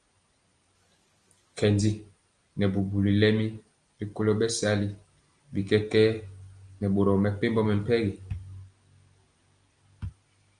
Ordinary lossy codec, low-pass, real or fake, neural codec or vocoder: Opus, 32 kbps; 9.9 kHz; real; none